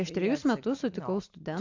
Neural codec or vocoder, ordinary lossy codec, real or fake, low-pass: none; AAC, 48 kbps; real; 7.2 kHz